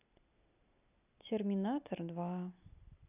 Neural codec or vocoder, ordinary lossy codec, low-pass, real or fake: none; none; 3.6 kHz; real